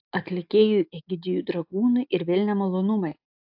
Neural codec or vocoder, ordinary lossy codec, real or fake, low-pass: none; AAC, 48 kbps; real; 5.4 kHz